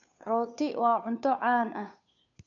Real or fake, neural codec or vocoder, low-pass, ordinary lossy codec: fake; codec, 16 kHz, 2 kbps, FunCodec, trained on Chinese and English, 25 frames a second; 7.2 kHz; none